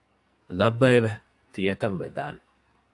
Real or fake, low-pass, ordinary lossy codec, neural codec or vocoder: fake; 10.8 kHz; AAC, 64 kbps; codec, 44.1 kHz, 2.6 kbps, SNAC